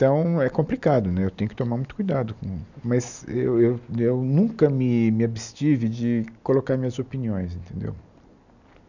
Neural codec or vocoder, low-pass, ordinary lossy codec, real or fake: none; 7.2 kHz; none; real